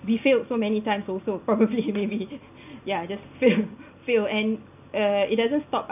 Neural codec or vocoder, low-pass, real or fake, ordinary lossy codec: none; 3.6 kHz; real; none